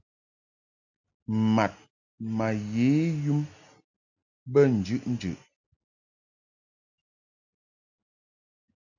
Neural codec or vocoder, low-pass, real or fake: none; 7.2 kHz; real